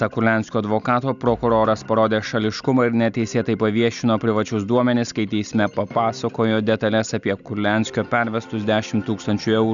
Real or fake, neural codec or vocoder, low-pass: real; none; 7.2 kHz